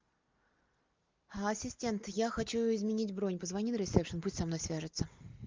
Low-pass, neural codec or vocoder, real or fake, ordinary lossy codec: 7.2 kHz; none; real; Opus, 24 kbps